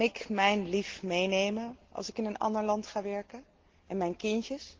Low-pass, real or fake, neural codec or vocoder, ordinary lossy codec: 7.2 kHz; real; none; Opus, 16 kbps